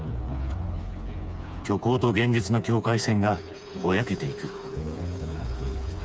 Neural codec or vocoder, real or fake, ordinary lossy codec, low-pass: codec, 16 kHz, 4 kbps, FreqCodec, smaller model; fake; none; none